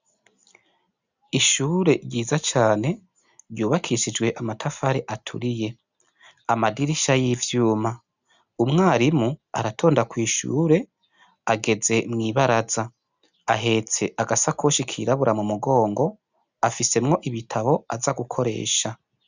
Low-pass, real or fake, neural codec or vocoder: 7.2 kHz; real; none